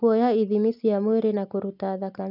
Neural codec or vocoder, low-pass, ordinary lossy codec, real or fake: none; 5.4 kHz; none; real